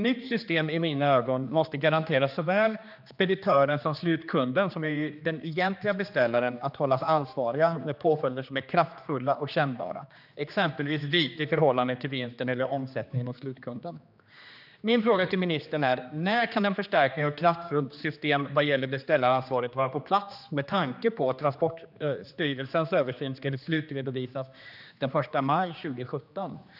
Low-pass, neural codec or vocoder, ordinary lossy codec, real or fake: 5.4 kHz; codec, 16 kHz, 2 kbps, X-Codec, HuBERT features, trained on general audio; none; fake